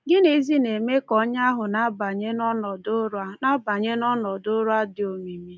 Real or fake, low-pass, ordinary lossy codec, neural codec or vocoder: real; none; none; none